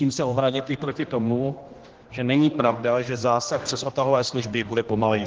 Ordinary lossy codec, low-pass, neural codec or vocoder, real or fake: Opus, 16 kbps; 7.2 kHz; codec, 16 kHz, 1 kbps, X-Codec, HuBERT features, trained on general audio; fake